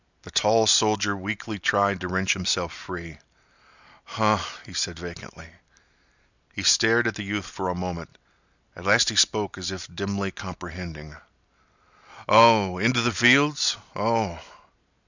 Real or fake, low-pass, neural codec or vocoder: real; 7.2 kHz; none